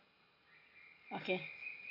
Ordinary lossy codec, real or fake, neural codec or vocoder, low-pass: AAC, 48 kbps; real; none; 5.4 kHz